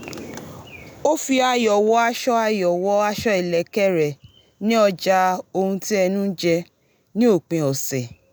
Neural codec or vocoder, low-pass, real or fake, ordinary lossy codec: none; none; real; none